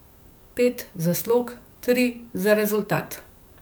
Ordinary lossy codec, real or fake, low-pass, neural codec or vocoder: none; fake; none; codec, 44.1 kHz, 7.8 kbps, DAC